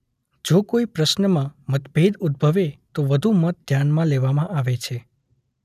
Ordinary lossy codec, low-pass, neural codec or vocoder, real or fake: none; 14.4 kHz; none; real